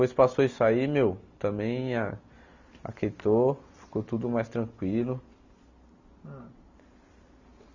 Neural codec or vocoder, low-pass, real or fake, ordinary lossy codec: none; 7.2 kHz; real; Opus, 64 kbps